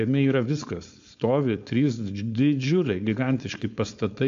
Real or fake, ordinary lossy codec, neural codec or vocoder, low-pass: fake; AAC, 64 kbps; codec, 16 kHz, 4.8 kbps, FACodec; 7.2 kHz